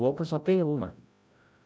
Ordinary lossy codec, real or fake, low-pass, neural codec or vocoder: none; fake; none; codec, 16 kHz, 0.5 kbps, FreqCodec, larger model